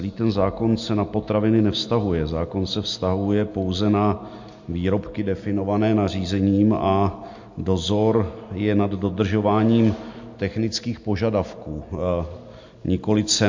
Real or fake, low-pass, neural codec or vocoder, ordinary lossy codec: real; 7.2 kHz; none; MP3, 48 kbps